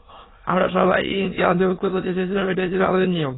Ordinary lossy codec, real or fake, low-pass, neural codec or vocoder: AAC, 16 kbps; fake; 7.2 kHz; autoencoder, 22.05 kHz, a latent of 192 numbers a frame, VITS, trained on many speakers